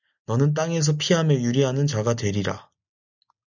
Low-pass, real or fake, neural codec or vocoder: 7.2 kHz; real; none